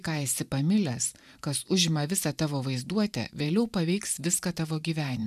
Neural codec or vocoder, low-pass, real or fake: vocoder, 44.1 kHz, 128 mel bands every 512 samples, BigVGAN v2; 14.4 kHz; fake